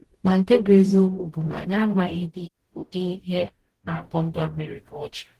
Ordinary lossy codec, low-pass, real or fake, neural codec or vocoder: Opus, 16 kbps; 14.4 kHz; fake; codec, 44.1 kHz, 0.9 kbps, DAC